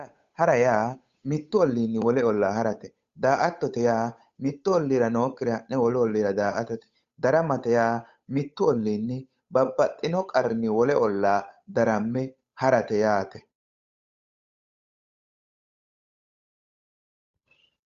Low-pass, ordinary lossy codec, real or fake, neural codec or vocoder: 7.2 kHz; Opus, 64 kbps; fake; codec, 16 kHz, 8 kbps, FunCodec, trained on Chinese and English, 25 frames a second